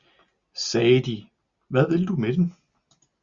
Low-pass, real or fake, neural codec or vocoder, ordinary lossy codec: 7.2 kHz; real; none; Opus, 64 kbps